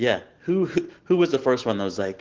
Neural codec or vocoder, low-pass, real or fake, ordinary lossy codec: none; 7.2 kHz; real; Opus, 16 kbps